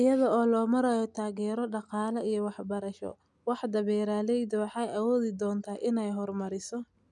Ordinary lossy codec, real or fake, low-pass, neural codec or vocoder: none; real; 10.8 kHz; none